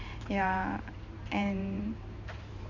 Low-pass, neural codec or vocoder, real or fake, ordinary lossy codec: 7.2 kHz; vocoder, 44.1 kHz, 128 mel bands every 512 samples, BigVGAN v2; fake; none